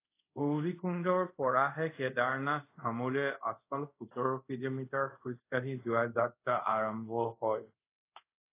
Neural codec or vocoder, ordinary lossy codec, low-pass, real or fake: codec, 24 kHz, 0.5 kbps, DualCodec; AAC, 24 kbps; 3.6 kHz; fake